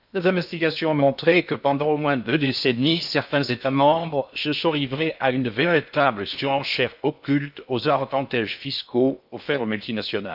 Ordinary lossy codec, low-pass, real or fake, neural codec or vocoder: none; 5.4 kHz; fake; codec, 16 kHz in and 24 kHz out, 0.6 kbps, FocalCodec, streaming, 2048 codes